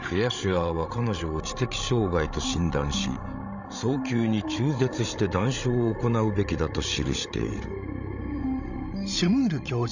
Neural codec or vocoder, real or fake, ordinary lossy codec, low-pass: codec, 16 kHz, 16 kbps, FreqCodec, larger model; fake; none; 7.2 kHz